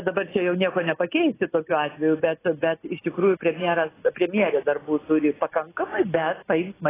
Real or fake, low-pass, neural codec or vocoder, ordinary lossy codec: real; 3.6 kHz; none; AAC, 16 kbps